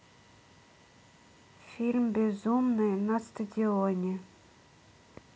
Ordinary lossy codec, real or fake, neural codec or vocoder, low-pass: none; real; none; none